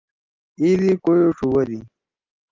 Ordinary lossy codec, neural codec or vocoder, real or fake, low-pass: Opus, 24 kbps; none; real; 7.2 kHz